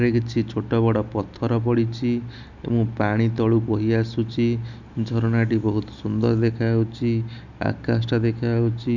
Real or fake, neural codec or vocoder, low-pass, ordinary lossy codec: real; none; 7.2 kHz; none